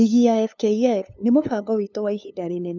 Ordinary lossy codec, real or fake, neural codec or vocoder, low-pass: none; fake; codec, 16 kHz, 2 kbps, FunCodec, trained on LibriTTS, 25 frames a second; 7.2 kHz